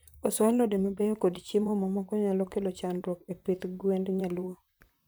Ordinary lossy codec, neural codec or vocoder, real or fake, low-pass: none; vocoder, 44.1 kHz, 128 mel bands, Pupu-Vocoder; fake; none